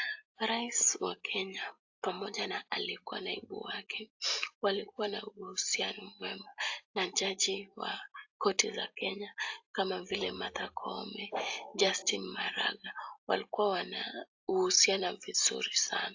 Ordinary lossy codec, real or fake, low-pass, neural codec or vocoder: AAC, 48 kbps; real; 7.2 kHz; none